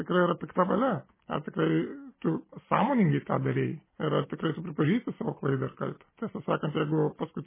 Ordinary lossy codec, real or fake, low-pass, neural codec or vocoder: MP3, 16 kbps; real; 3.6 kHz; none